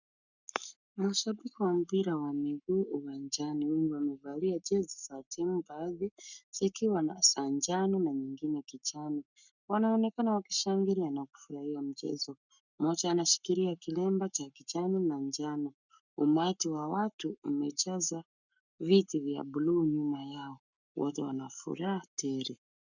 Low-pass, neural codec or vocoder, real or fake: 7.2 kHz; codec, 44.1 kHz, 7.8 kbps, Pupu-Codec; fake